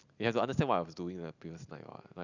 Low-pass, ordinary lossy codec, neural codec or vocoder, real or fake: 7.2 kHz; none; none; real